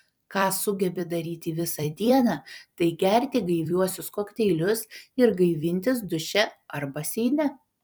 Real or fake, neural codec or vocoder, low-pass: fake; vocoder, 44.1 kHz, 128 mel bands every 256 samples, BigVGAN v2; 19.8 kHz